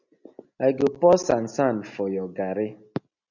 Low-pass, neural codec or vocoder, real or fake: 7.2 kHz; none; real